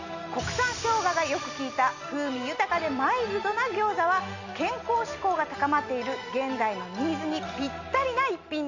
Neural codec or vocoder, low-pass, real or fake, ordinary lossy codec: none; 7.2 kHz; real; none